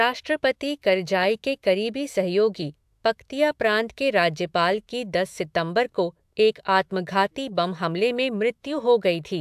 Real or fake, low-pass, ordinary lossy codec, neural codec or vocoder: fake; 14.4 kHz; AAC, 96 kbps; autoencoder, 48 kHz, 128 numbers a frame, DAC-VAE, trained on Japanese speech